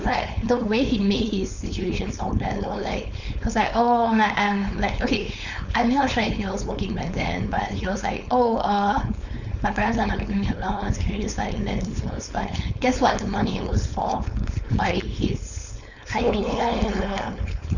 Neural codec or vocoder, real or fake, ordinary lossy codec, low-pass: codec, 16 kHz, 4.8 kbps, FACodec; fake; none; 7.2 kHz